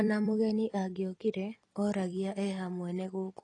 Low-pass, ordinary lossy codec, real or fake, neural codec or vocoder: 10.8 kHz; AAC, 32 kbps; fake; vocoder, 44.1 kHz, 128 mel bands every 256 samples, BigVGAN v2